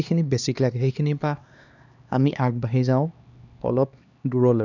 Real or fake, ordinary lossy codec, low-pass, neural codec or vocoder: fake; none; 7.2 kHz; codec, 16 kHz, 2 kbps, X-Codec, HuBERT features, trained on LibriSpeech